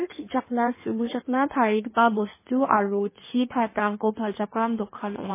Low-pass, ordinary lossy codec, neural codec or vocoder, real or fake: 3.6 kHz; MP3, 16 kbps; codec, 16 kHz in and 24 kHz out, 1.1 kbps, FireRedTTS-2 codec; fake